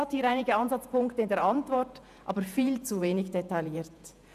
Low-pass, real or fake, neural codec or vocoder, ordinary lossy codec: 14.4 kHz; fake; vocoder, 48 kHz, 128 mel bands, Vocos; none